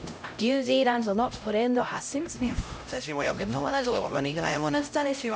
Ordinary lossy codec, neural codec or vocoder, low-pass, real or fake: none; codec, 16 kHz, 0.5 kbps, X-Codec, HuBERT features, trained on LibriSpeech; none; fake